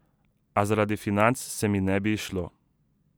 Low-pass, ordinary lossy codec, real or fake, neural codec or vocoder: none; none; real; none